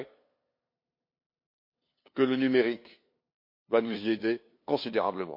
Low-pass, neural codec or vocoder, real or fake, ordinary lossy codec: 5.4 kHz; codec, 16 kHz, 2 kbps, FunCodec, trained on LibriTTS, 25 frames a second; fake; MP3, 32 kbps